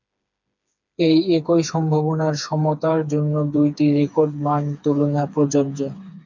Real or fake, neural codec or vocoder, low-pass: fake; codec, 16 kHz, 4 kbps, FreqCodec, smaller model; 7.2 kHz